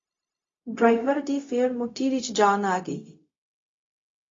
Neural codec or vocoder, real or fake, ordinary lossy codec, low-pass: codec, 16 kHz, 0.4 kbps, LongCat-Audio-Codec; fake; AAC, 32 kbps; 7.2 kHz